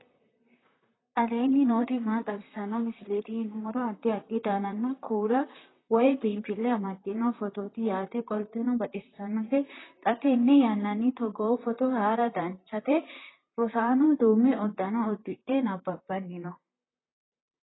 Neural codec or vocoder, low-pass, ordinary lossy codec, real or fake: vocoder, 44.1 kHz, 128 mel bands, Pupu-Vocoder; 7.2 kHz; AAC, 16 kbps; fake